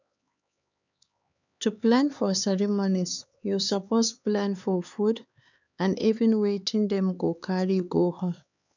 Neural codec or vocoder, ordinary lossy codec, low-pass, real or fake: codec, 16 kHz, 4 kbps, X-Codec, HuBERT features, trained on LibriSpeech; none; 7.2 kHz; fake